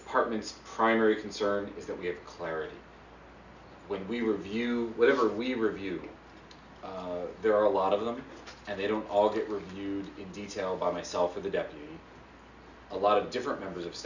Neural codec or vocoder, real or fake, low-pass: none; real; 7.2 kHz